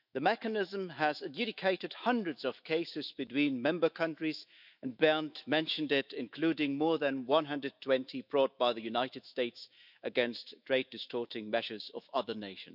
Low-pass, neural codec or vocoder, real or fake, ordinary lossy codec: 5.4 kHz; autoencoder, 48 kHz, 128 numbers a frame, DAC-VAE, trained on Japanese speech; fake; none